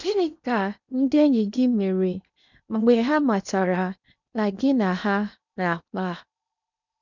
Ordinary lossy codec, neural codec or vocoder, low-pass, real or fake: none; codec, 16 kHz in and 24 kHz out, 0.8 kbps, FocalCodec, streaming, 65536 codes; 7.2 kHz; fake